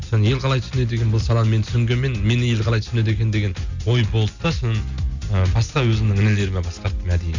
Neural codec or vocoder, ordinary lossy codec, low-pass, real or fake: none; none; 7.2 kHz; real